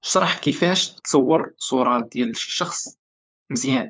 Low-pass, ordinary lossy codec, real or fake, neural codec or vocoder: none; none; fake; codec, 16 kHz, 4 kbps, FunCodec, trained on LibriTTS, 50 frames a second